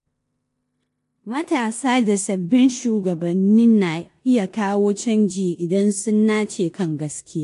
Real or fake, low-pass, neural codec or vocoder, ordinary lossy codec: fake; 10.8 kHz; codec, 16 kHz in and 24 kHz out, 0.9 kbps, LongCat-Audio-Codec, four codebook decoder; AAC, 64 kbps